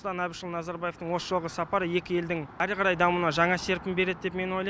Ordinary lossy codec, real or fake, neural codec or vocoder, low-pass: none; real; none; none